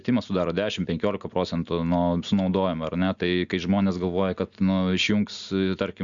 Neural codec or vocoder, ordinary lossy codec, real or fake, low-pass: none; Opus, 64 kbps; real; 7.2 kHz